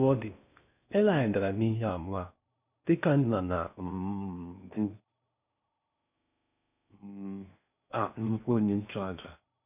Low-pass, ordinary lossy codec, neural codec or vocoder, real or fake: 3.6 kHz; none; codec, 16 kHz in and 24 kHz out, 0.6 kbps, FocalCodec, streaming, 4096 codes; fake